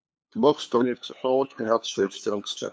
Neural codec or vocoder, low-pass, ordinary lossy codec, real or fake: codec, 16 kHz, 2 kbps, FunCodec, trained on LibriTTS, 25 frames a second; none; none; fake